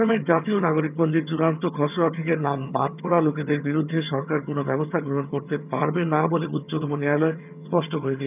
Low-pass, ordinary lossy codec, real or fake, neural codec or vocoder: 3.6 kHz; none; fake; vocoder, 22.05 kHz, 80 mel bands, HiFi-GAN